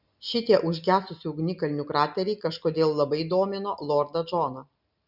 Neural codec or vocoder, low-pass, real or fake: none; 5.4 kHz; real